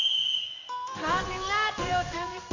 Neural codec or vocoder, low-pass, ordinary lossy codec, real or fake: codec, 16 kHz in and 24 kHz out, 1 kbps, XY-Tokenizer; 7.2 kHz; none; fake